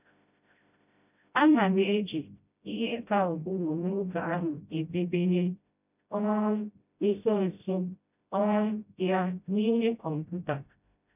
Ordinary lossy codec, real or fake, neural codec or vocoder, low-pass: none; fake; codec, 16 kHz, 0.5 kbps, FreqCodec, smaller model; 3.6 kHz